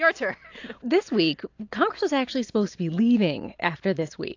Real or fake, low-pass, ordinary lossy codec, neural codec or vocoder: real; 7.2 kHz; AAC, 48 kbps; none